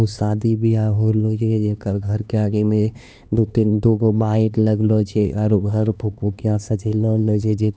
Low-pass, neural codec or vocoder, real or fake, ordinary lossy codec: none; codec, 16 kHz, 2 kbps, X-Codec, HuBERT features, trained on LibriSpeech; fake; none